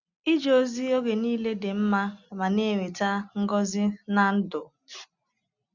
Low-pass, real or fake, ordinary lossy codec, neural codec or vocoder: 7.2 kHz; real; none; none